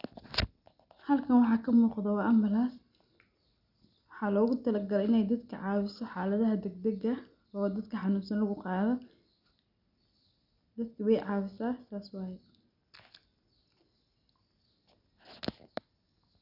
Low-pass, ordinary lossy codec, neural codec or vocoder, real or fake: 5.4 kHz; none; none; real